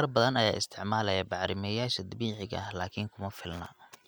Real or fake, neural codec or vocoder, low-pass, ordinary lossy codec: real; none; none; none